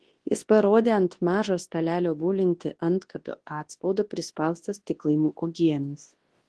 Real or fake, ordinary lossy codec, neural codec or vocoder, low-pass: fake; Opus, 16 kbps; codec, 24 kHz, 0.9 kbps, WavTokenizer, large speech release; 10.8 kHz